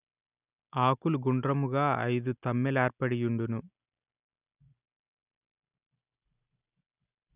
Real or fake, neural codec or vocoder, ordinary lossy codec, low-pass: real; none; none; 3.6 kHz